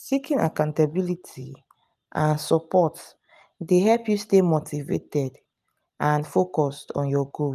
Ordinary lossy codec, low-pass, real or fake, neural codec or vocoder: none; 14.4 kHz; real; none